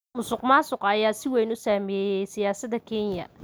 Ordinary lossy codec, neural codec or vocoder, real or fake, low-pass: none; none; real; none